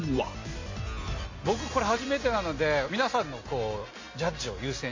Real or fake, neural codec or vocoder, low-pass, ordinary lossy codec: real; none; 7.2 kHz; MP3, 32 kbps